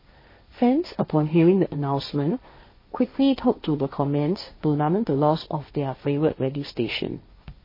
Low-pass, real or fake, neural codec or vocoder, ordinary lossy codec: 5.4 kHz; fake; codec, 16 kHz, 1.1 kbps, Voila-Tokenizer; MP3, 24 kbps